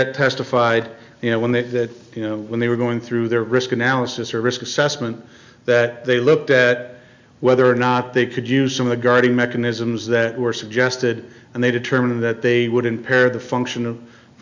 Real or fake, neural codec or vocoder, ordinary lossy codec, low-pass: real; none; MP3, 64 kbps; 7.2 kHz